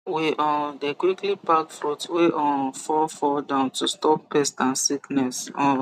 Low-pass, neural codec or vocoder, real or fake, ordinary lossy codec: 14.4 kHz; none; real; none